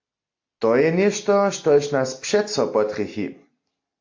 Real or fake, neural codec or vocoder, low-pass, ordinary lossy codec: real; none; 7.2 kHz; AAC, 48 kbps